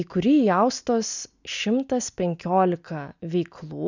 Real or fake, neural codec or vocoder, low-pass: real; none; 7.2 kHz